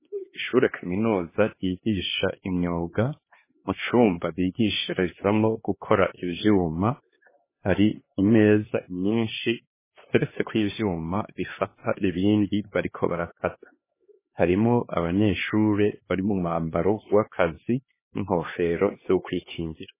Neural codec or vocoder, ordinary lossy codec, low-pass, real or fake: codec, 16 kHz, 2 kbps, X-Codec, HuBERT features, trained on LibriSpeech; MP3, 16 kbps; 3.6 kHz; fake